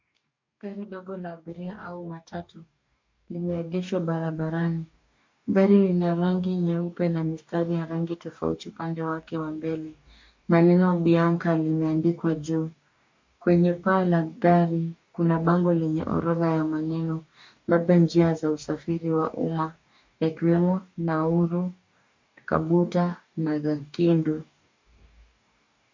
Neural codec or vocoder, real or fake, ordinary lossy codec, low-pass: codec, 44.1 kHz, 2.6 kbps, DAC; fake; MP3, 48 kbps; 7.2 kHz